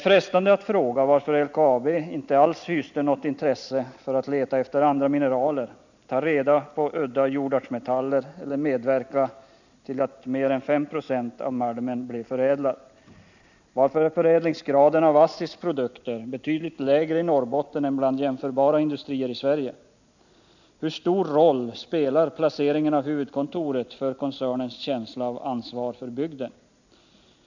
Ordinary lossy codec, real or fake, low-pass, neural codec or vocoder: none; real; 7.2 kHz; none